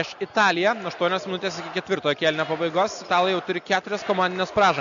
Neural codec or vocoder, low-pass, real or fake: none; 7.2 kHz; real